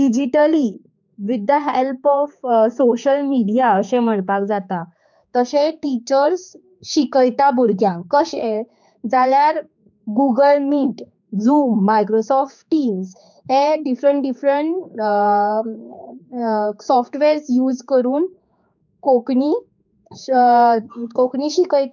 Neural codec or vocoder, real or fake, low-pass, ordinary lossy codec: codec, 16 kHz, 4 kbps, X-Codec, HuBERT features, trained on general audio; fake; 7.2 kHz; none